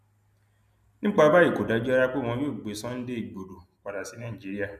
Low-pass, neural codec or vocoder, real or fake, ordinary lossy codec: 14.4 kHz; none; real; none